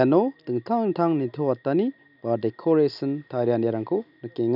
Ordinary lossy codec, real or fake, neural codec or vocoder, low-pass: none; real; none; 5.4 kHz